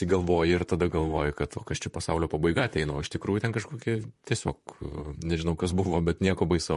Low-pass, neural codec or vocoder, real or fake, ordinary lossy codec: 14.4 kHz; vocoder, 44.1 kHz, 128 mel bands, Pupu-Vocoder; fake; MP3, 48 kbps